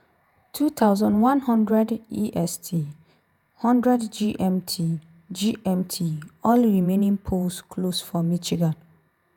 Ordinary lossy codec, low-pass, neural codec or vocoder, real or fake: none; none; vocoder, 48 kHz, 128 mel bands, Vocos; fake